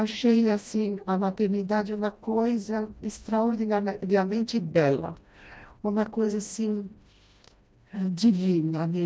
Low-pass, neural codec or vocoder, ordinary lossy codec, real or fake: none; codec, 16 kHz, 1 kbps, FreqCodec, smaller model; none; fake